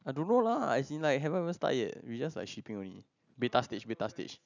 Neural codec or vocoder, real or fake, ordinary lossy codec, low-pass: none; real; none; 7.2 kHz